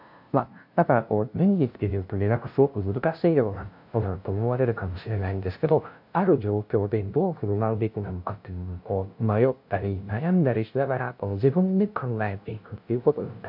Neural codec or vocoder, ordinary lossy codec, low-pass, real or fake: codec, 16 kHz, 0.5 kbps, FunCodec, trained on LibriTTS, 25 frames a second; AAC, 48 kbps; 5.4 kHz; fake